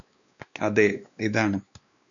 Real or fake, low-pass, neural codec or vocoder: fake; 7.2 kHz; codec, 16 kHz, 2 kbps, X-Codec, WavLM features, trained on Multilingual LibriSpeech